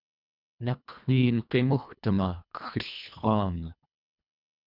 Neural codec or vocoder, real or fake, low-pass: codec, 24 kHz, 1.5 kbps, HILCodec; fake; 5.4 kHz